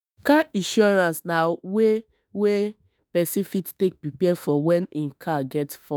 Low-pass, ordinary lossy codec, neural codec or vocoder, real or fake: none; none; autoencoder, 48 kHz, 32 numbers a frame, DAC-VAE, trained on Japanese speech; fake